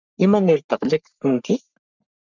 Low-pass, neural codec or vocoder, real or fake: 7.2 kHz; codec, 44.1 kHz, 3.4 kbps, Pupu-Codec; fake